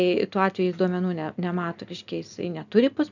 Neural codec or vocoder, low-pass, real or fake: none; 7.2 kHz; real